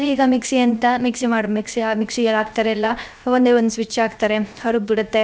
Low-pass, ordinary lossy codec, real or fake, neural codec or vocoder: none; none; fake; codec, 16 kHz, 0.7 kbps, FocalCodec